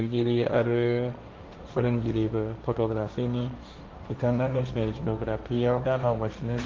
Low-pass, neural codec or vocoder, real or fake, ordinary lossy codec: 7.2 kHz; codec, 16 kHz, 1.1 kbps, Voila-Tokenizer; fake; Opus, 24 kbps